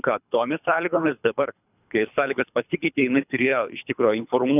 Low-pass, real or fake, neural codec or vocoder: 3.6 kHz; fake; codec, 24 kHz, 3 kbps, HILCodec